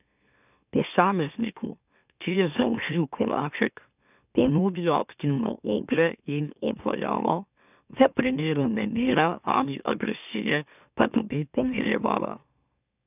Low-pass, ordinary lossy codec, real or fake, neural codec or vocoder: 3.6 kHz; none; fake; autoencoder, 44.1 kHz, a latent of 192 numbers a frame, MeloTTS